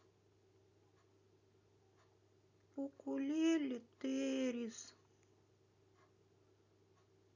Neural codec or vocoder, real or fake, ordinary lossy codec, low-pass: none; real; none; 7.2 kHz